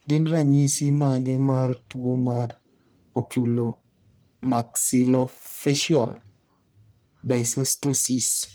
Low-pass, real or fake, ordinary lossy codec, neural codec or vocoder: none; fake; none; codec, 44.1 kHz, 1.7 kbps, Pupu-Codec